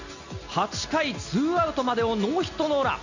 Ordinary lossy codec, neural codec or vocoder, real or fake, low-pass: none; none; real; 7.2 kHz